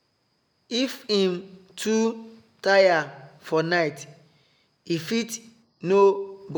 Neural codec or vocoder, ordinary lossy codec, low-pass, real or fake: none; none; none; real